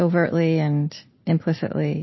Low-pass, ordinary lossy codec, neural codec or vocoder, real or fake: 7.2 kHz; MP3, 24 kbps; none; real